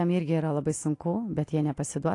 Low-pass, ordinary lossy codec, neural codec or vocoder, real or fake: 10.8 kHz; AAC, 48 kbps; none; real